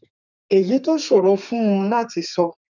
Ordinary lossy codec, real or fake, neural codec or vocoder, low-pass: none; fake; codec, 32 kHz, 1.9 kbps, SNAC; 7.2 kHz